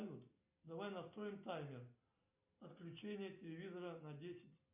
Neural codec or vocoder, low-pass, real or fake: none; 3.6 kHz; real